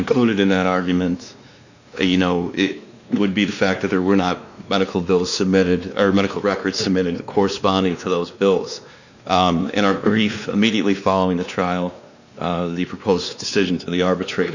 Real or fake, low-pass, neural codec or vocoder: fake; 7.2 kHz; codec, 16 kHz, 2 kbps, X-Codec, WavLM features, trained on Multilingual LibriSpeech